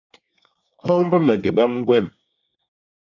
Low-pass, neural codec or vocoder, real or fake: 7.2 kHz; codec, 24 kHz, 1 kbps, SNAC; fake